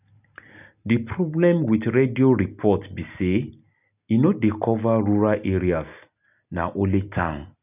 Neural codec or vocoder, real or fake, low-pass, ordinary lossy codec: none; real; 3.6 kHz; none